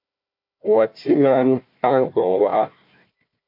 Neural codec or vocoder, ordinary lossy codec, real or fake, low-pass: codec, 16 kHz, 1 kbps, FunCodec, trained on Chinese and English, 50 frames a second; MP3, 48 kbps; fake; 5.4 kHz